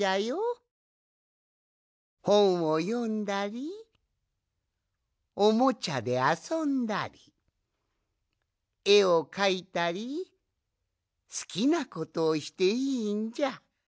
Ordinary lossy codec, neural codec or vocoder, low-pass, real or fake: none; none; none; real